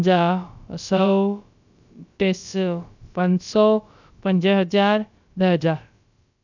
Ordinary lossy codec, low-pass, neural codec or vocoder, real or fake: none; 7.2 kHz; codec, 16 kHz, about 1 kbps, DyCAST, with the encoder's durations; fake